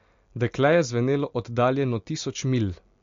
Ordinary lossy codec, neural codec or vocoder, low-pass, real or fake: MP3, 48 kbps; none; 7.2 kHz; real